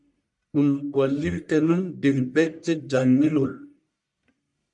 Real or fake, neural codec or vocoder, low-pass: fake; codec, 44.1 kHz, 1.7 kbps, Pupu-Codec; 10.8 kHz